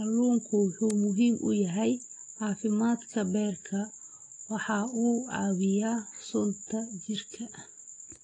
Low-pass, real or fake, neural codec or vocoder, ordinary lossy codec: 9.9 kHz; real; none; AAC, 32 kbps